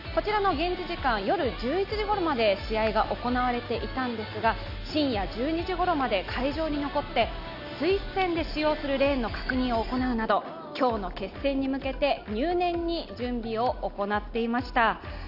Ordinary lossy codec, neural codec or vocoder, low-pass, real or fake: none; none; 5.4 kHz; real